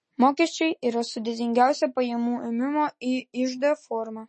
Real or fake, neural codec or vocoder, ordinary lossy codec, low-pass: real; none; MP3, 32 kbps; 10.8 kHz